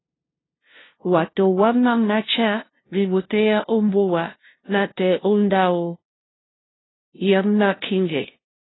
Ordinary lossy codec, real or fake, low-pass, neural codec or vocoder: AAC, 16 kbps; fake; 7.2 kHz; codec, 16 kHz, 0.5 kbps, FunCodec, trained on LibriTTS, 25 frames a second